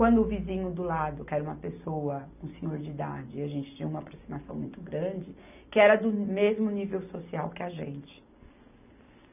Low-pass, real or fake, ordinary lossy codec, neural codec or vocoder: 3.6 kHz; real; none; none